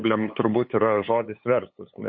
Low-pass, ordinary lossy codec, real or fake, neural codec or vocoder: 7.2 kHz; MP3, 32 kbps; fake; codec, 16 kHz, 8 kbps, FunCodec, trained on LibriTTS, 25 frames a second